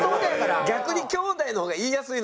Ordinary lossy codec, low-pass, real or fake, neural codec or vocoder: none; none; real; none